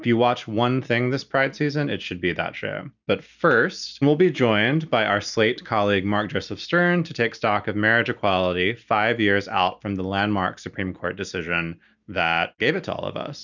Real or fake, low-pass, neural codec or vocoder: real; 7.2 kHz; none